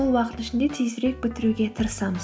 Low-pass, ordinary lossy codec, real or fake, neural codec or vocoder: none; none; real; none